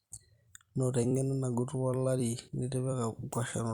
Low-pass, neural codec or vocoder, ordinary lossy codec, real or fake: 19.8 kHz; vocoder, 44.1 kHz, 128 mel bands every 256 samples, BigVGAN v2; Opus, 64 kbps; fake